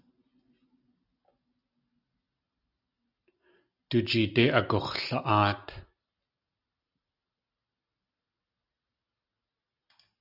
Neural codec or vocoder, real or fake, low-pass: none; real; 5.4 kHz